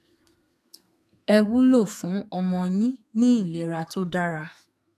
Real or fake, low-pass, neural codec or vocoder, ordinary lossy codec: fake; 14.4 kHz; codec, 32 kHz, 1.9 kbps, SNAC; none